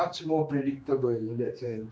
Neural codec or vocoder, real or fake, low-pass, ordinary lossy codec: codec, 16 kHz, 2 kbps, X-Codec, HuBERT features, trained on general audio; fake; none; none